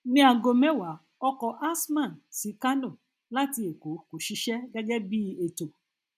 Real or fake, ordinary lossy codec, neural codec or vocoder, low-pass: real; none; none; 14.4 kHz